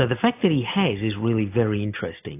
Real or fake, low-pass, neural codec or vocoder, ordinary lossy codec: real; 3.6 kHz; none; AAC, 24 kbps